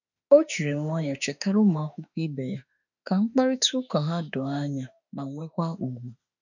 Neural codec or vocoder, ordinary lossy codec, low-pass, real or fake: autoencoder, 48 kHz, 32 numbers a frame, DAC-VAE, trained on Japanese speech; none; 7.2 kHz; fake